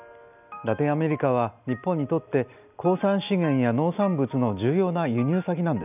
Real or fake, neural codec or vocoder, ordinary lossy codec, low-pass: real; none; none; 3.6 kHz